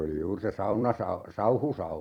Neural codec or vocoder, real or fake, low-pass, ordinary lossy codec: vocoder, 44.1 kHz, 128 mel bands every 256 samples, BigVGAN v2; fake; 19.8 kHz; none